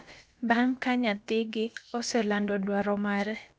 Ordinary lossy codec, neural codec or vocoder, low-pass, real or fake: none; codec, 16 kHz, about 1 kbps, DyCAST, with the encoder's durations; none; fake